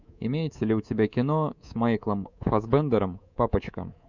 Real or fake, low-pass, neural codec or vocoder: fake; 7.2 kHz; codec, 24 kHz, 3.1 kbps, DualCodec